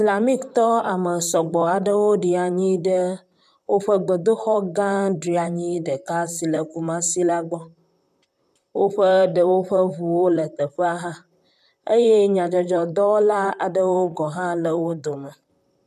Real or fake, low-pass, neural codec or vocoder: fake; 14.4 kHz; vocoder, 44.1 kHz, 128 mel bands, Pupu-Vocoder